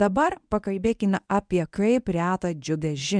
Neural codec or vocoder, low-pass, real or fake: codec, 24 kHz, 0.9 kbps, WavTokenizer, medium speech release version 1; 9.9 kHz; fake